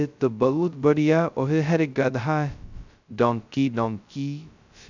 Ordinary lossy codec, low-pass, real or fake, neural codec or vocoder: none; 7.2 kHz; fake; codec, 16 kHz, 0.2 kbps, FocalCodec